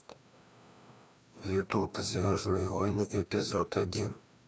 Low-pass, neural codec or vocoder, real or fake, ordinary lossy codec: none; codec, 16 kHz, 1 kbps, FreqCodec, larger model; fake; none